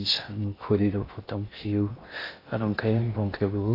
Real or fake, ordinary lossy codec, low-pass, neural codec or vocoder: fake; AAC, 24 kbps; 5.4 kHz; codec, 16 kHz in and 24 kHz out, 0.6 kbps, FocalCodec, streaming, 4096 codes